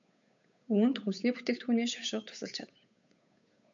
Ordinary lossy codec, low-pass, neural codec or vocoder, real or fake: AAC, 64 kbps; 7.2 kHz; codec, 16 kHz, 8 kbps, FunCodec, trained on Chinese and English, 25 frames a second; fake